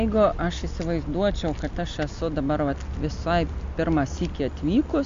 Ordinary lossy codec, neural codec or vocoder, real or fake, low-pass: MP3, 48 kbps; none; real; 7.2 kHz